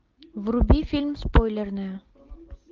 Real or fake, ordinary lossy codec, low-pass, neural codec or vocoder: real; Opus, 32 kbps; 7.2 kHz; none